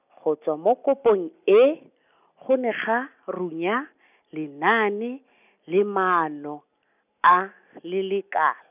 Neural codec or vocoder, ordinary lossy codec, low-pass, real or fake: none; none; 3.6 kHz; real